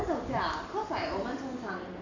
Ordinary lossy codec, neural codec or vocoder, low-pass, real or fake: Opus, 64 kbps; none; 7.2 kHz; real